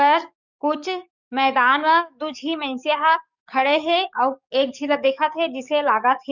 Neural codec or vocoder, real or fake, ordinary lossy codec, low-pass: codec, 44.1 kHz, 7.8 kbps, DAC; fake; none; 7.2 kHz